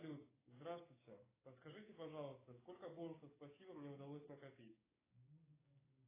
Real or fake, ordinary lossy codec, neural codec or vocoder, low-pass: fake; AAC, 16 kbps; codec, 16 kHz, 6 kbps, DAC; 3.6 kHz